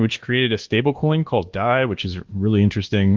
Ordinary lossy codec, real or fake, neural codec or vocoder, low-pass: Opus, 16 kbps; fake; codec, 24 kHz, 1.2 kbps, DualCodec; 7.2 kHz